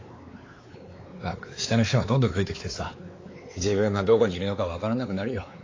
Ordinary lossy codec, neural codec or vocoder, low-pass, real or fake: AAC, 32 kbps; codec, 16 kHz, 4 kbps, X-Codec, WavLM features, trained on Multilingual LibriSpeech; 7.2 kHz; fake